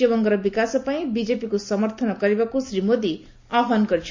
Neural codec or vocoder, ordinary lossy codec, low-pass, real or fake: none; MP3, 48 kbps; 7.2 kHz; real